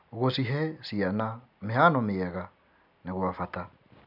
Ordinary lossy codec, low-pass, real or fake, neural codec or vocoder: none; 5.4 kHz; real; none